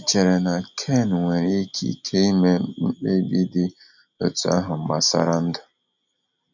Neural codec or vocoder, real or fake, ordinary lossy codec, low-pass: none; real; none; 7.2 kHz